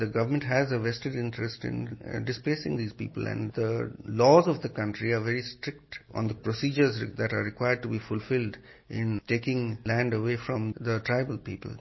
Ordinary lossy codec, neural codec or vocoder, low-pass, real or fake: MP3, 24 kbps; none; 7.2 kHz; real